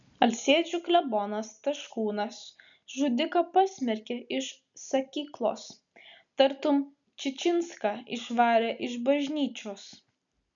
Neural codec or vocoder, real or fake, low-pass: none; real; 7.2 kHz